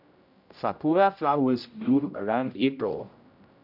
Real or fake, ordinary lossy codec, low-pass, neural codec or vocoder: fake; none; 5.4 kHz; codec, 16 kHz, 0.5 kbps, X-Codec, HuBERT features, trained on general audio